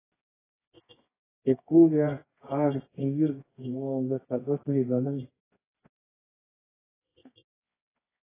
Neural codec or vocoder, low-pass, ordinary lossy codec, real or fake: codec, 24 kHz, 0.9 kbps, WavTokenizer, medium music audio release; 3.6 kHz; AAC, 16 kbps; fake